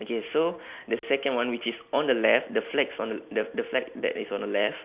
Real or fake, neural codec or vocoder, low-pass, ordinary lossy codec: real; none; 3.6 kHz; Opus, 64 kbps